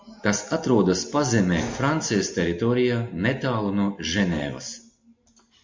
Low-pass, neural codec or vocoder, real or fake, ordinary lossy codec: 7.2 kHz; none; real; MP3, 48 kbps